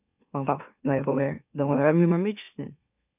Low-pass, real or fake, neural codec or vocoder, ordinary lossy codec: 3.6 kHz; fake; autoencoder, 44.1 kHz, a latent of 192 numbers a frame, MeloTTS; none